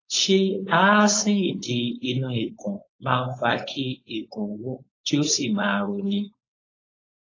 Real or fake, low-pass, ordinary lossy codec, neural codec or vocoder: fake; 7.2 kHz; AAC, 32 kbps; codec, 16 kHz, 4.8 kbps, FACodec